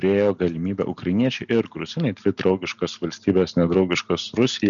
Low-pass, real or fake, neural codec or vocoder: 7.2 kHz; real; none